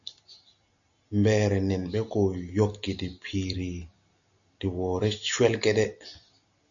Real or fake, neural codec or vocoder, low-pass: real; none; 7.2 kHz